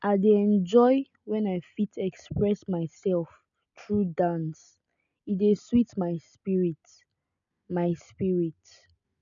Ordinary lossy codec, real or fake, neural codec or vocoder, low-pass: none; real; none; 7.2 kHz